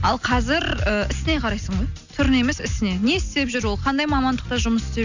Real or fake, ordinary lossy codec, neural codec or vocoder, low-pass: real; none; none; 7.2 kHz